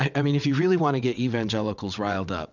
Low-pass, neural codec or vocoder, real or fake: 7.2 kHz; vocoder, 22.05 kHz, 80 mel bands, WaveNeXt; fake